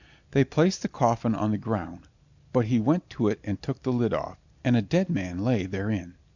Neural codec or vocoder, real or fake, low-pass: none; real; 7.2 kHz